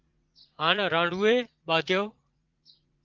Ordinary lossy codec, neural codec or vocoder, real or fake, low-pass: Opus, 32 kbps; vocoder, 44.1 kHz, 80 mel bands, Vocos; fake; 7.2 kHz